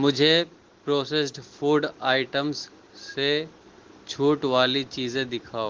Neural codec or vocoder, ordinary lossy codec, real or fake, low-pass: none; Opus, 16 kbps; real; 7.2 kHz